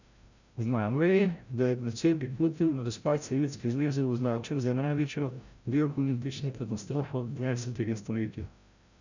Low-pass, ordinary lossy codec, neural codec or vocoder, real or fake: 7.2 kHz; none; codec, 16 kHz, 0.5 kbps, FreqCodec, larger model; fake